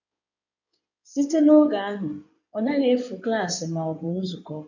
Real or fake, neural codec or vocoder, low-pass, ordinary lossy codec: fake; codec, 16 kHz in and 24 kHz out, 2.2 kbps, FireRedTTS-2 codec; 7.2 kHz; none